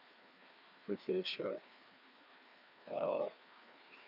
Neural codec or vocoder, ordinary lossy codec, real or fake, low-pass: codec, 16 kHz, 2 kbps, FreqCodec, larger model; none; fake; 5.4 kHz